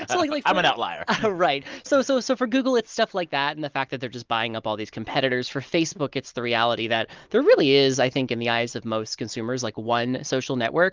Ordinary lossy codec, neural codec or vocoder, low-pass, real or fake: Opus, 24 kbps; none; 7.2 kHz; real